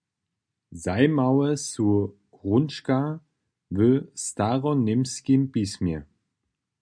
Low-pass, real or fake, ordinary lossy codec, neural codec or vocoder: 9.9 kHz; real; MP3, 96 kbps; none